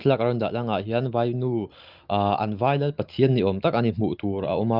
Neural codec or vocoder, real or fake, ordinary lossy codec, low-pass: none; real; Opus, 32 kbps; 5.4 kHz